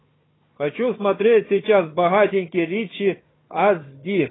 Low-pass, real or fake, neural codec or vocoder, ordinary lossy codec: 7.2 kHz; fake; codec, 16 kHz, 4 kbps, FunCodec, trained on Chinese and English, 50 frames a second; AAC, 16 kbps